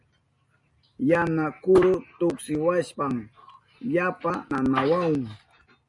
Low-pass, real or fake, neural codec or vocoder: 10.8 kHz; real; none